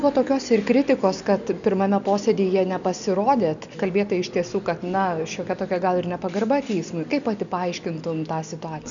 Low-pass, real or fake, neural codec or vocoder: 7.2 kHz; real; none